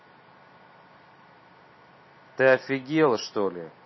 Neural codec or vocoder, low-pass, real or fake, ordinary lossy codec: vocoder, 44.1 kHz, 128 mel bands every 512 samples, BigVGAN v2; 7.2 kHz; fake; MP3, 24 kbps